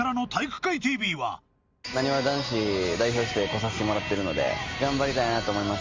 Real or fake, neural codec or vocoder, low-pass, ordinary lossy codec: real; none; 7.2 kHz; Opus, 32 kbps